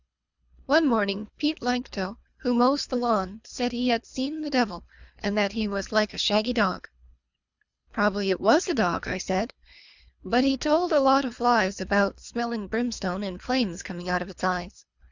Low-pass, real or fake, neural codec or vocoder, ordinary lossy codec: 7.2 kHz; fake; codec, 24 kHz, 3 kbps, HILCodec; Opus, 64 kbps